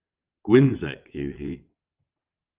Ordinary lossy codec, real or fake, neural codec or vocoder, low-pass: Opus, 24 kbps; fake; vocoder, 22.05 kHz, 80 mel bands, WaveNeXt; 3.6 kHz